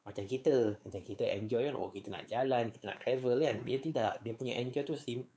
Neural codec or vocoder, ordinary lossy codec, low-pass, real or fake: codec, 16 kHz, 4 kbps, X-Codec, WavLM features, trained on Multilingual LibriSpeech; none; none; fake